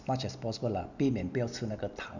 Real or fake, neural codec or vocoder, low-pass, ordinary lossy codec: real; none; 7.2 kHz; none